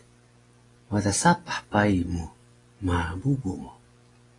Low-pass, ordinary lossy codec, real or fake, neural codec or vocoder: 10.8 kHz; AAC, 32 kbps; real; none